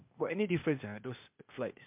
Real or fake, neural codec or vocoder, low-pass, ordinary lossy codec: fake; codec, 16 kHz, 0.8 kbps, ZipCodec; 3.6 kHz; MP3, 32 kbps